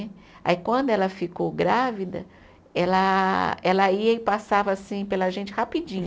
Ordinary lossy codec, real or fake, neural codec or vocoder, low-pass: none; real; none; none